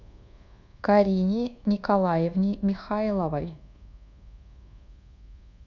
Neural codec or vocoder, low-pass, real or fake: codec, 24 kHz, 1.2 kbps, DualCodec; 7.2 kHz; fake